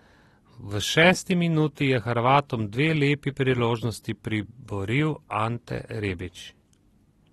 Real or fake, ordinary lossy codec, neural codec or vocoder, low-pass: real; AAC, 32 kbps; none; 19.8 kHz